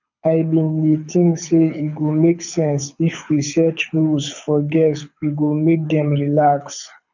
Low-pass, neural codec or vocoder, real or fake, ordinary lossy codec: 7.2 kHz; codec, 24 kHz, 6 kbps, HILCodec; fake; none